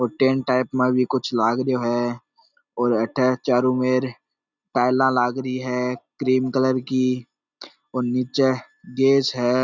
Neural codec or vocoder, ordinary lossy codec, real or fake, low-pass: none; none; real; 7.2 kHz